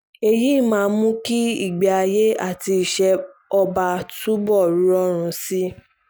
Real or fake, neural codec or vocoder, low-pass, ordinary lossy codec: real; none; none; none